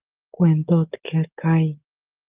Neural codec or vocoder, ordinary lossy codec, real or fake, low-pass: codec, 44.1 kHz, 7.8 kbps, DAC; Opus, 32 kbps; fake; 3.6 kHz